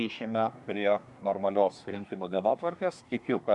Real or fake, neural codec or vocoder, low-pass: fake; codec, 24 kHz, 1 kbps, SNAC; 10.8 kHz